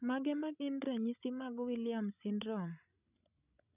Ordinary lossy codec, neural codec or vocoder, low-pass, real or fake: none; codec, 16 kHz, 16 kbps, FreqCodec, larger model; 3.6 kHz; fake